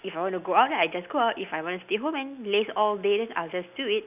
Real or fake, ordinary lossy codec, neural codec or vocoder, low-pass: real; none; none; 3.6 kHz